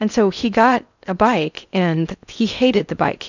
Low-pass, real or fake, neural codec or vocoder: 7.2 kHz; fake; codec, 16 kHz in and 24 kHz out, 0.8 kbps, FocalCodec, streaming, 65536 codes